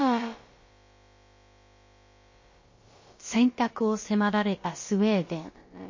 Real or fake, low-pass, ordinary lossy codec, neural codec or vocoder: fake; 7.2 kHz; MP3, 32 kbps; codec, 16 kHz, about 1 kbps, DyCAST, with the encoder's durations